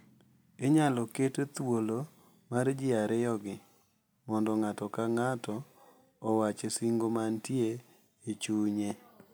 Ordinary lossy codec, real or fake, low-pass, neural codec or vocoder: none; real; none; none